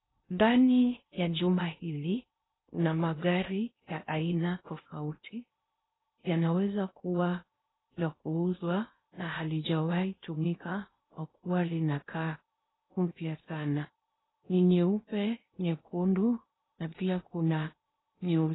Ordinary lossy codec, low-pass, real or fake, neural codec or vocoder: AAC, 16 kbps; 7.2 kHz; fake; codec, 16 kHz in and 24 kHz out, 0.6 kbps, FocalCodec, streaming, 4096 codes